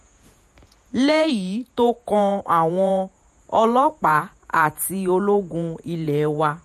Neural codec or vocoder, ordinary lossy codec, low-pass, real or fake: vocoder, 48 kHz, 128 mel bands, Vocos; MP3, 64 kbps; 14.4 kHz; fake